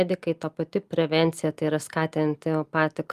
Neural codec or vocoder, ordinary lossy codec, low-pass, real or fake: none; Opus, 32 kbps; 14.4 kHz; real